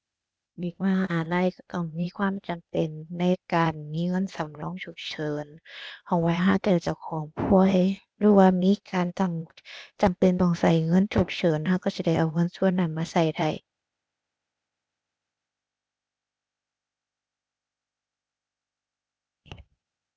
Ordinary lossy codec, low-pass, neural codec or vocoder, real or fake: none; none; codec, 16 kHz, 0.8 kbps, ZipCodec; fake